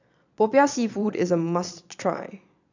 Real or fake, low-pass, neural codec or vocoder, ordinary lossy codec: fake; 7.2 kHz; vocoder, 22.05 kHz, 80 mel bands, Vocos; none